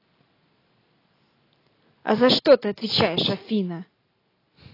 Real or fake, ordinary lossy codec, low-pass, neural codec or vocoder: real; AAC, 24 kbps; 5.4 kHz; none